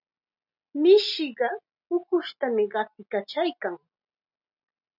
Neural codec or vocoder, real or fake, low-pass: none; real; 5.4 kHz